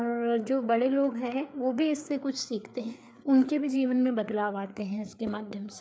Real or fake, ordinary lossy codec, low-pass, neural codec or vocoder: fake; none; none; codec, 16 kHz, 4 kbps, FreqCodec, larger model